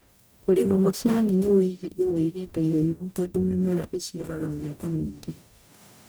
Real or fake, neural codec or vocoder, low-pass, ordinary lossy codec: fake; codec, 44.1 kHz, 0.9 kbps, DAC; none; none